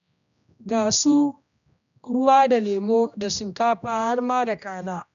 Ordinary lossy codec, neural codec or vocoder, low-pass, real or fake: none; codec, 16 kHz, 1 kbps, X-Codec, HuBERT features, trained on general audio; 7.2 kHz; fake